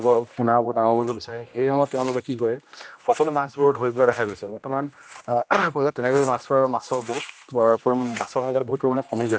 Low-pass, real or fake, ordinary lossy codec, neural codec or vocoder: none; fake; none; codec, 16 kHz, 1 kbps, X-Codec, HuBERT features, trained on general audio